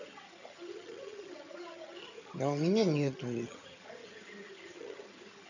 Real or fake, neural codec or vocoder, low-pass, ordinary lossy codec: fake; vocoder, 22.05 kHz, 80 mel bands, HiFi-GAN; 7.2 kHz; none